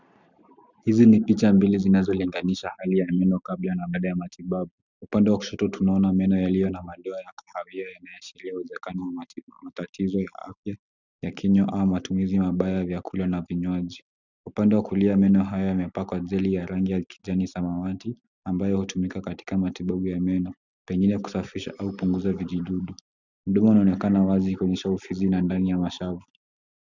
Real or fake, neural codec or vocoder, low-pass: real; none; 7.2 kHz